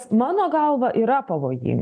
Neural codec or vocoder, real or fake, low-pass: none; real; 9.9 kHz